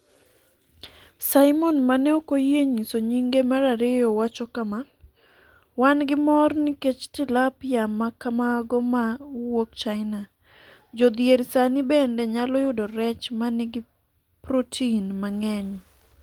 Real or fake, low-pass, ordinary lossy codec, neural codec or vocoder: real; 19.8 kHz; Opus, 24 kbps; none